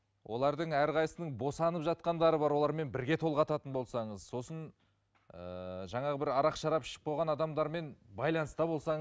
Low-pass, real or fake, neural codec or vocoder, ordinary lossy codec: none; real; none; none